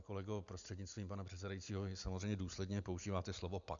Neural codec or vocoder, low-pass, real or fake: none; 7.2 kHz; real